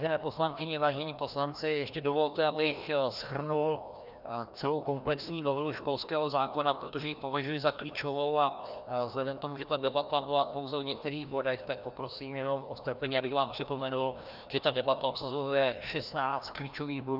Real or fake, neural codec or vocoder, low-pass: fake; codec, 16 kHz, 1 kbps, FreqCodec, larger model; 5.4 kHz